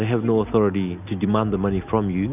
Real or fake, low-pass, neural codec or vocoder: real; 3.6 kHz; none